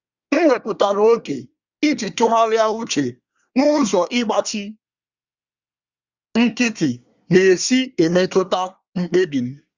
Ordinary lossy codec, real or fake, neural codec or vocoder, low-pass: Opus, 64 kbps; fake; codec, 24 kHz, 1 kbps, SNAC; 7.2 kHz